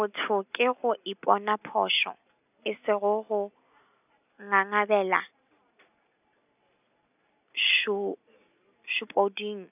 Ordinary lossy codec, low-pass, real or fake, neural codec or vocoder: none; 3.6 kHz; real; none